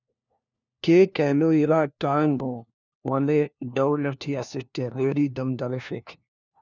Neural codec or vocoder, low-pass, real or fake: codec, 16 kHz, 1 kbps, FunCodec, trained on LibriTTS, 50 frames a second; 7.2 kHz; fake